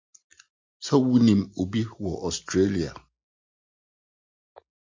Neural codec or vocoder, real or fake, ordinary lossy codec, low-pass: none; real; MP3, 48 kbps; 7.2 kHz